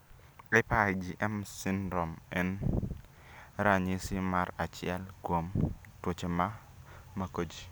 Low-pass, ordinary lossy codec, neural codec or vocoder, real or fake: none; none; none; real